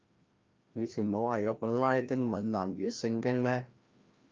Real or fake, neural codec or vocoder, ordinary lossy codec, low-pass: fake; codec, 16 kHz, 1 kbps, FreqCodec, larger model; Opus, 24 kbps; 7.2 kHz